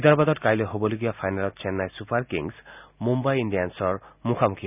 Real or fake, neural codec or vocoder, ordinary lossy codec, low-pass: real; none; none; 3.6 kHz